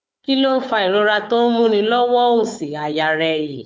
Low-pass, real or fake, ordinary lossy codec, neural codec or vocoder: none; fake; none; codec, 16 kHz, 4 kbps, FunCodec, trained on Chinese and English, 50 frames a second